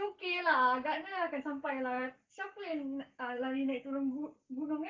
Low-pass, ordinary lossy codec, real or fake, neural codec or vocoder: 7.2 kHz; Opus, 32 kbps; fake; codec, 44.1 kHz, 7.8 kbps, Pupu-Codec